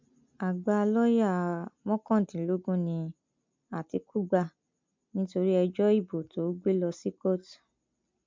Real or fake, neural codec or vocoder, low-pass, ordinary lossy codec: real; none; 7.2 kHz; MP3, 64 kbps